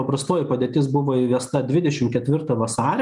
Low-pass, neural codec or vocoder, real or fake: 10.8 kHz; none; real